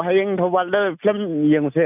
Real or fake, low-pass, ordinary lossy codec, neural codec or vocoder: real; 3.6 kHz; none; none